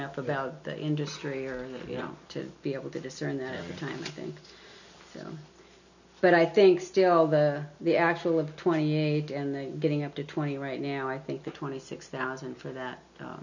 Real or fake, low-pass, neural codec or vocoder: real; 7.2 kHz; none